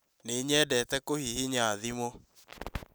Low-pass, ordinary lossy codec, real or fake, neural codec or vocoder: none; none; real; none